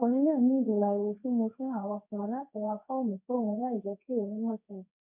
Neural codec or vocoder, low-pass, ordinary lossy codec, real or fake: codec, 24 kHz, 0.9 kbps, WavTokenizer, medium speech release version 2; 3.6 kHz; none; fake